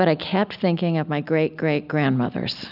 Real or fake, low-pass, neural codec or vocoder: real; 5.4 kHz; none